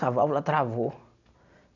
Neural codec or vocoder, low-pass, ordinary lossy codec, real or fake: none; 7.2 kHz; none; real